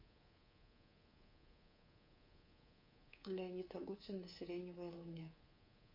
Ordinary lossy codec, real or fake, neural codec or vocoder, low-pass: MP3, 32 kbps; fake; codec, 24 kHz, 3.1 kbps, DualCodec; 5.4 kHz